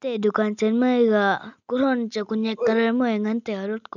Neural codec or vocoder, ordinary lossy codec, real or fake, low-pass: none; none; real; 7.2 kHz